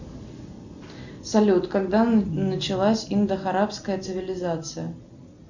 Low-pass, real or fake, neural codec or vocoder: 7.2 kHz; real; none